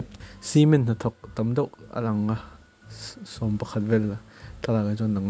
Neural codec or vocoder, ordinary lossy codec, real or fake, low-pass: none; none; real; none